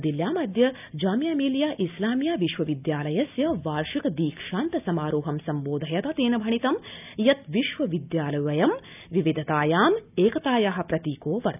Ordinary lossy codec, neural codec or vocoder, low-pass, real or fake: none; none; 3.6 kHz; real